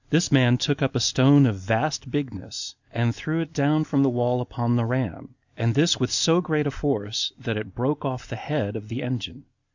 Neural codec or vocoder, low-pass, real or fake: none; 7.2 kHz; real